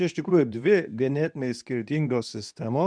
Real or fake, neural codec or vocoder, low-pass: fake; codec, 24 kHz, 0.9 kbps, WavTokenizer, medium speech release version 2; 9.9 kHz